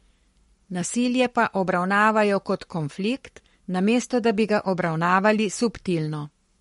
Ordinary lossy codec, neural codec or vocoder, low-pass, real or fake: MP3, 48 kbps; codec, 44.1 kHz, 7.8 kbps, Pupu-Codec; 19.8 kHz; fake